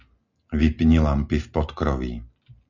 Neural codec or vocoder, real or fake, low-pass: none; real; 7.2 kHz